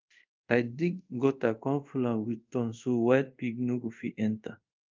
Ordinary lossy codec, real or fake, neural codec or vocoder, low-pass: Opus, 24 kbps; fake; codec, 24 kHz, 0.5 kbps, DualCodec; 7.2 kHz